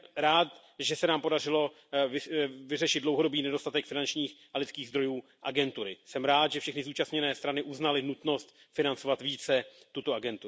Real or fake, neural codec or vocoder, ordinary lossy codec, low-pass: real; none; none; none